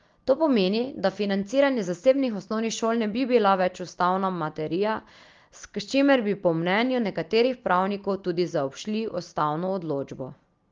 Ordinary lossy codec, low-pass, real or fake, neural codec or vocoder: Opus, 32 kbps; 7.2 kHz; real; none